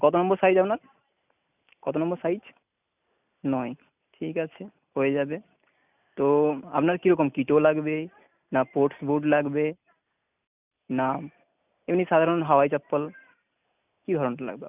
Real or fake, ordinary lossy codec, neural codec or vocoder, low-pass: real; none; none; 3.6 kHz